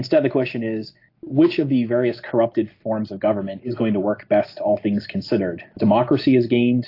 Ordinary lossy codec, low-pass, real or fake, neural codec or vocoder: AAC, 32 kbps; 5.4 kHz; real; none